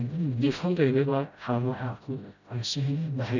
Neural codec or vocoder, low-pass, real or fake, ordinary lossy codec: codec, 16 kHz, 0.5 kbps, FreqCodec, smaller model; 7.2 kHz; fake; none